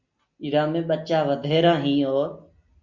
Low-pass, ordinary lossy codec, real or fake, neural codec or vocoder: 7.2 kHz; Opus, 64 kbps; real; none